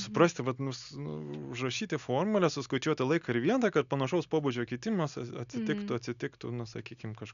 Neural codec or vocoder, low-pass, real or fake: none; 7.2 kHz; real